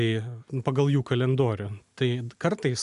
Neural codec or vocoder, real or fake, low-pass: none; real; 10.8 kHz